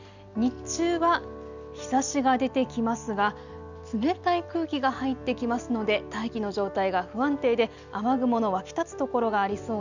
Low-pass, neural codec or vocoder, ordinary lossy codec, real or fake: 7.2 kHz; none; none; real